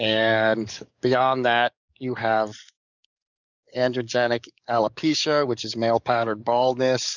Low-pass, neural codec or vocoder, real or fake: 7.2 kHz; codec, 44.1 kHz, 7.8 kbps, DAC; fake